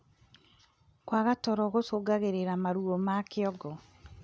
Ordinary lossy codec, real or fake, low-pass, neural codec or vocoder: none; real; none; none